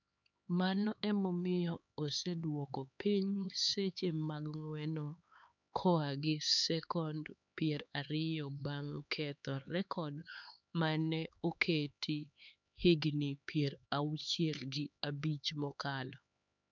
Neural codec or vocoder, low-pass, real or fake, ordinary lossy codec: codec, 16 kHz, 4 kbps, X-Codec, HuBERT features, trained on LibriSpeech; 7.2 kHz; fake; none